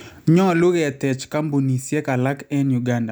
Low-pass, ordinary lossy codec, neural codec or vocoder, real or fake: none; none; none; real